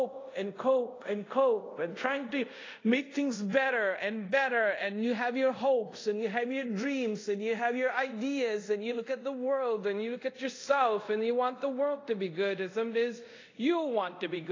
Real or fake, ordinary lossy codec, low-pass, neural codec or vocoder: fake; AAC, 32 kbps; 7.2 kHz; codec, 24 kHz, 0.5 kbps, DualCodec